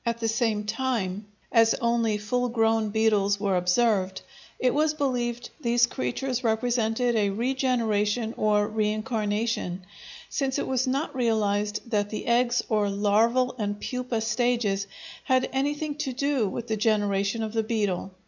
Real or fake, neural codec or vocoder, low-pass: real; none; 7.2 kHz